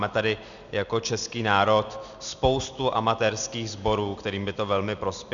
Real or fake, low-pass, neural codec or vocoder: real; 7.2 kHz; none